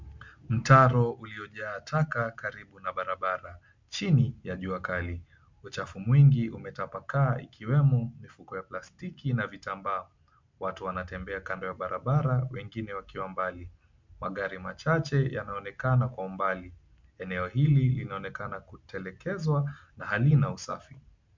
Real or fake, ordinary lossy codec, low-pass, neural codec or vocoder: real; MP3, 64 kbps; 7.2 kHz; none